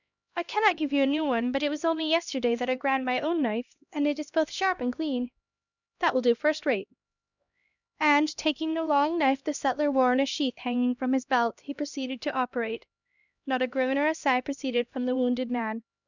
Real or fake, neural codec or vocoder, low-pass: fake; codec, 16 kHz, 1 kbps, X-Codec, HuBERT features, trained on LibriSpeech; 7.2 kHz